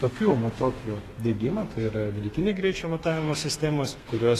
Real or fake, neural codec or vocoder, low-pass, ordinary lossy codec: fake; codec, 32 kHz, 1.9 kbps, SNAC; 14.4 kHz; AAC, 48 kbps